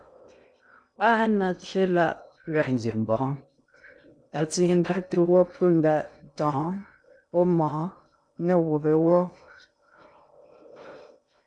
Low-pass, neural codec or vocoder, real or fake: 9.9 kHz; codec, 16 kHz in and 24 kHz out, 0.6 kbps, FocalCodec, streaming, 2048 codes; fake